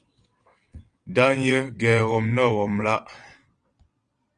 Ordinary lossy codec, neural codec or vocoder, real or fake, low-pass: Opus, 32 kbps; vocoder, 22.05 kHz, 80 mel bands, WaveNeXt; fake; 9.9 kHz